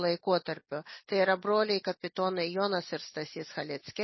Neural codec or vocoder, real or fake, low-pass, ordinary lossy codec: none; real; 7.2 kHz; MP3, 24 kbps